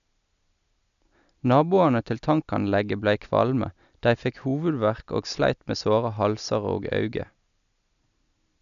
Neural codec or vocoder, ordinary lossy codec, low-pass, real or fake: none; none; 7.2 kHz; real